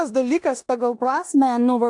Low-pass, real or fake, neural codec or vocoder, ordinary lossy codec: 10.8 kHz; fake; codec, 16 kHz in and 24 kHz out, 0.9 kbps, LongCat-Audio-Codec, four codebook decoder; AAC, 64 kbps